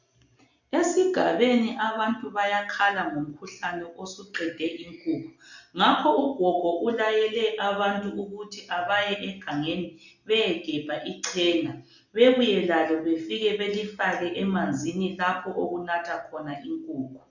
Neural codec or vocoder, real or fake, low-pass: none; real; 7.2 kHz